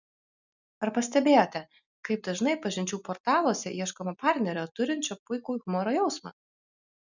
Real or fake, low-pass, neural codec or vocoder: real; 7.2 kHz; none